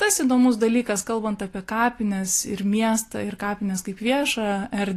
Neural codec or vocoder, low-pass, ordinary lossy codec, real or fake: none; 14.4 kHz; AAC, 48 kbps; real